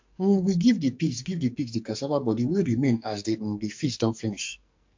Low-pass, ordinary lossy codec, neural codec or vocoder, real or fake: 7.2 kHz; MP3, 48 kbps; codec, 44.1 kHz, 2.6 kbps, SNAC; fake